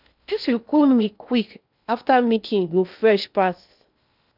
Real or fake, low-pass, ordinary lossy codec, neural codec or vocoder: fake; 5.4 kHz; none; codec, 16 kHz in and 24 kHz out, 0.6 kbps, FocalCodec, streaming, 4096 codes